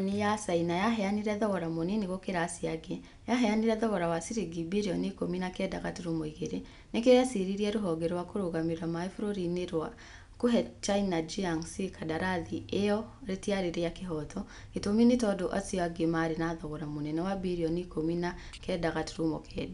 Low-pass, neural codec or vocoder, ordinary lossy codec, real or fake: 10.8 kHz; none; none; real